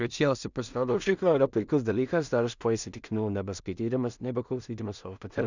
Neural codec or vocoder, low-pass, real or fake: codec, 16 kHz in and 24 kHz out, 0.4 kbps, LongCat-Audio-Codec, two codebook decoder; 7.2 kHz; fake